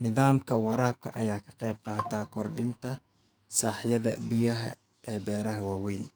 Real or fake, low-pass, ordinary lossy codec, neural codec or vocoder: fake; none; none; codec, 44.1 kHz, 2.6 kbps, SNAC